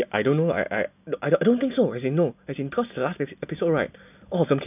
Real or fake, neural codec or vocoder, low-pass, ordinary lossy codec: real; none; 3.6 kHz; none